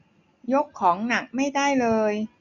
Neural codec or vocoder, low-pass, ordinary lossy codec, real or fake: none; 7.2 kHz; none; real